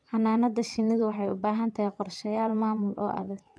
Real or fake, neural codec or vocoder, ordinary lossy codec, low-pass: fake; vocoder, 22.05 kHz, 80 mel bands, WaveNeXt; none; none